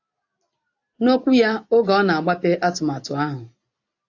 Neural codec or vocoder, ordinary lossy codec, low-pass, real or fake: none; AAC, 48 kbps; 7.2 kHz; real